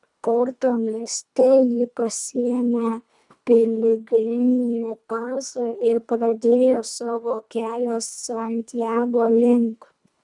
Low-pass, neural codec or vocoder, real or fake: 10.8 kHz; codec, 24 kHz, 1.5 kbps, HILCodec; fake